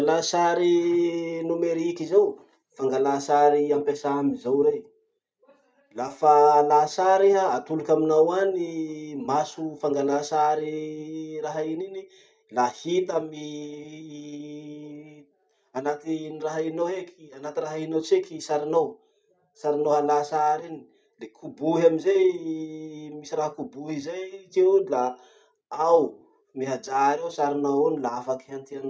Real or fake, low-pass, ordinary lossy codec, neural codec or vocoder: real; none; none; none